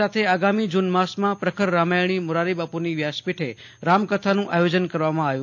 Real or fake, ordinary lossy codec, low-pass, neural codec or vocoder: real; AAC, 48 kbps; 7.2 kHz; none